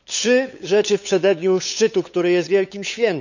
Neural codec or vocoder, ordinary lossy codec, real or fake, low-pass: codec, 16 kHz, 8 kbps, FunCodec, trained on LibriTTS, 25 frames a second; none; fake; 7.2 kHz